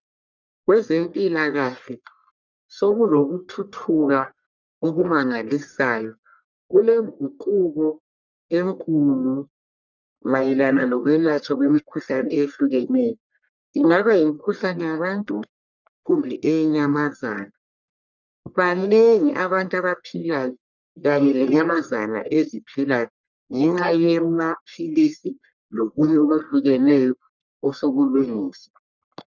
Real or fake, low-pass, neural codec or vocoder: fake; 7.2 kHz; codec, 44.1 kHz, 1.7 kbps, Pupu-Codec